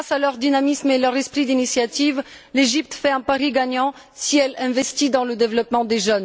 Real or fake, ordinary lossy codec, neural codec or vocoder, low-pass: real; none; none; none